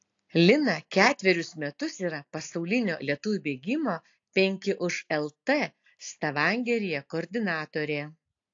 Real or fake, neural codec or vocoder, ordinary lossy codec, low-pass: real; none; AAC, 48 kbps; 7.2 kHz